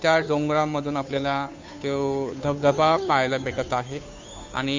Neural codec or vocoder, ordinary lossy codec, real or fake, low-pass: codec, 16 kHz, 2 kbps, FunCodec, trained on Chinese and English, 25 frames a second; MP3, 64 kbps; fake; 7.2 kHz